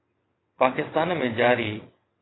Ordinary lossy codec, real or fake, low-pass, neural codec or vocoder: AAC, 16 kbps; fake; 7.2 kHz; vocoder, 22.05 kHz, 80 mel bands, WaveNeXt